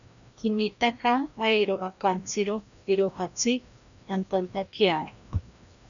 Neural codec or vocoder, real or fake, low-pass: codec, 16 kHz, 1 kbps, FreqCodec, larger model; fake; 7.2 kHz